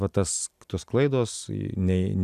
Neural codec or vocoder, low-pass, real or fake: none; 14.4 kHz; real